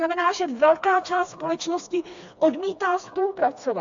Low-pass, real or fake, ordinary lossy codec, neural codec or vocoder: 7.2 kHz; fake; AAC, 64 kbps; codec, 16 kHz, 2 kbps, FreqCodec, smaller model